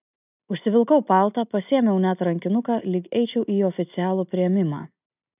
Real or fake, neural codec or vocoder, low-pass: real; none; 3.6 kHz